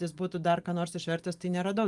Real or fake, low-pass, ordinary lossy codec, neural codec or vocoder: real; 10.8 kHz; Opus, 32 kbps; none